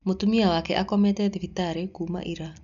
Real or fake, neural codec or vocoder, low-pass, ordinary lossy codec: real; none; 7.2 kHz; MP3, 96 kbps